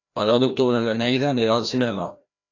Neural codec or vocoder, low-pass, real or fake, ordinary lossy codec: codec, 16 kHz, 1 kbps, FreqCodec, larger model; 7.2 kHz; fake; AAC, 48 kbps